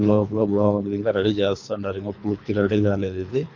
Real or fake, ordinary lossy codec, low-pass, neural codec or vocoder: fake; none; 7.2 kHz; codec, 24 kHz, 3 kbps, HILCodec